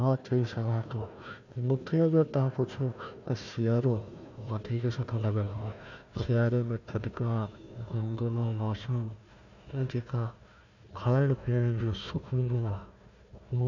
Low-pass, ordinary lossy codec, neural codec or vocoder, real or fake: 7.2 kHz; none; codec, 16 kHz, 1 kbps, FunCodec, trained on Chinese and English, 50 frames a second; fake